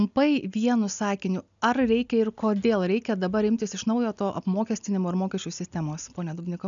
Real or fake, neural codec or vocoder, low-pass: real; none; 7.2 kHz